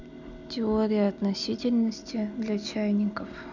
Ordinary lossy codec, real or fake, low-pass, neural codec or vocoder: none; real; 7.2 kHz; none